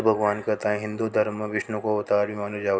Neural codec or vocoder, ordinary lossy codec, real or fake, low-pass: none; none; real; none